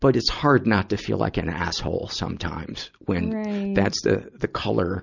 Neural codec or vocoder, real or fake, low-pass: none; real; 7.2 kHz